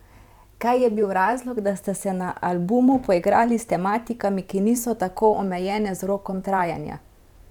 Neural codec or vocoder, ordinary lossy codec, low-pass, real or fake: vocoder, 44.1 kHz, 128 mel bands every 512 samples, BigVGAN v2; none; 19.8 kHz; fake